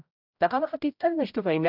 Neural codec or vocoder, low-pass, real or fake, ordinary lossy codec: codec, 16 kHz, 0.5 kbps, X-Codec, HuBERT features, trained on general audio; 5.4 kHz; fake; AAC, 48 kbps